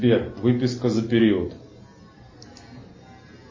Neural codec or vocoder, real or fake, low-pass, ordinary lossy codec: none; real; 7.2 kHz; MP3, 32 kbps